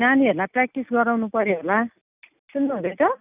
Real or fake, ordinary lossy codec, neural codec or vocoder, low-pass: real; none; none; 3.6 kHz